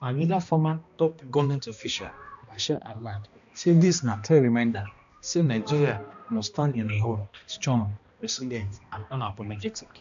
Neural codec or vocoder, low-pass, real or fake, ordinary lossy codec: codec, 16 kHz, 1 kbps, X-Codec, HuBERT features, trained on balanced general audio; 7.2 kHz; fake; AAC, 96 kbps